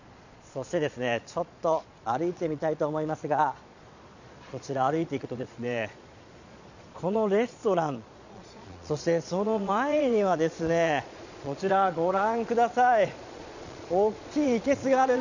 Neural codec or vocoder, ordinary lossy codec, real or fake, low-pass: vocoder, 22.05 kHz, 80 mel bands, Vocos; none; fake; 7.2 kHz